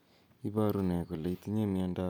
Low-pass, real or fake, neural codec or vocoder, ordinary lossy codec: none; real; none; none